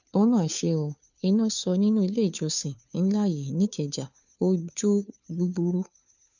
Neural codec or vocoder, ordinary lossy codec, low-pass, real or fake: codec, 16 kHz, 2 kbps, FunCodec, trained on Chinese and English, 25 frames a second; none; 7.2 kHz; fake